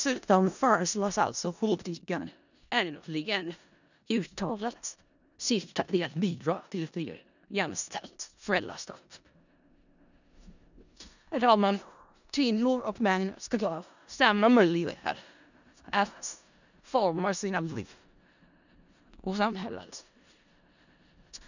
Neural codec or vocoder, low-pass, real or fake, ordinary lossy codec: codec, 16 kHz in and 24 kHz out, 0.4 kbps, LongCat-Audio-Codec, four codebook decoder; 7.2 kHz; fake; none